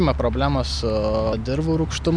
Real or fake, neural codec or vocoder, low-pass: real; none; 9.9 kHz